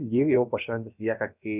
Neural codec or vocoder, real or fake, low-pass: codec, 16 kHz, about 1 kbps, DyCAST, with the encoder's durations; fake; 3.6 kHz